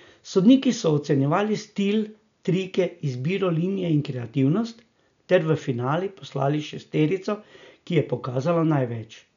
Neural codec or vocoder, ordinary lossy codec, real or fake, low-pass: none; none; real; 7.2 kHz